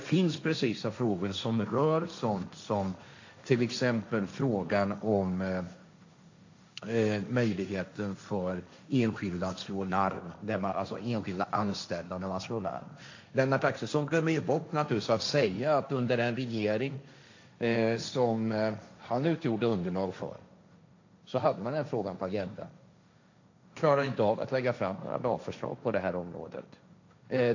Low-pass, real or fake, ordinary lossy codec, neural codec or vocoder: 7.2 kHz; fake; AAC, 48 kbps; codec, 16 kHz, 1.1 kbps, Voila-Tokenizer